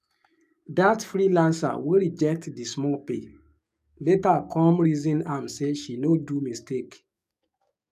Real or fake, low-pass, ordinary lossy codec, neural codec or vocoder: fake; 14.4 kHz; none; codec, 44.1 kHz, 7.8 kbps, DAC